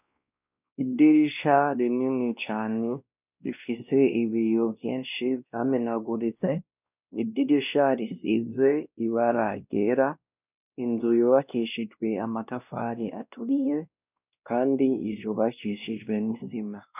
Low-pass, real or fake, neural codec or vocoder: 3.6 kHz; fake; codec, 16 kHz, 1 kbps, X-Codec, WavLM features, trained on Multilingual LibriSpeech